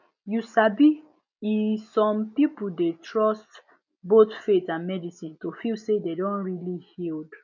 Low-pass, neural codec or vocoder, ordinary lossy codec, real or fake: 7.2 kHz; none; none; real